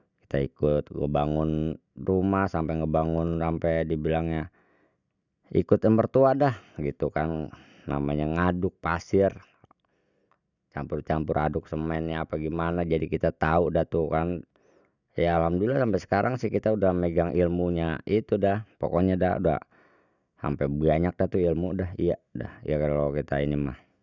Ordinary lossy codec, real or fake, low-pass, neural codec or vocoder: none; real; 7.2 kHz; none